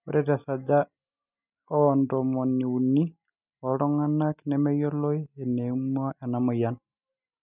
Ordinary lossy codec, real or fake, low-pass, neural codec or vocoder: none; real; 3.6 kHz; none